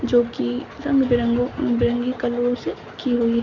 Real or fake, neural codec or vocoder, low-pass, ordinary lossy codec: real; none; 7.2 kHz; none